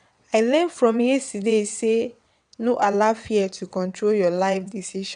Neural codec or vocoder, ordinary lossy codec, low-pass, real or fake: vocoder, 22.05 kHz, 80 mel bands, WaveNeXt; none; 9.9 kHz; fake